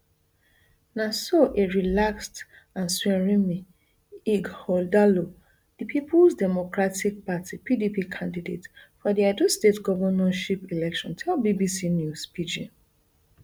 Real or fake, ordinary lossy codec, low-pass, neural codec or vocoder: real; none; none; none